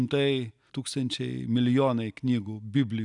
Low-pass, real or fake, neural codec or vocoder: 10.8 kHz; real; none